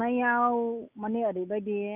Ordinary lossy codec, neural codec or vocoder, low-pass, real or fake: none; none; 3.6 kHz; real